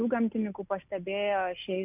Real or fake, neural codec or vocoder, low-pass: real; none; 3.6 kHz